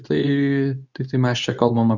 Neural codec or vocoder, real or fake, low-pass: codec, 24 kHz, 0.9 kbps, WavTokenizer, medium speech release version 2; fake; 7.2 kHz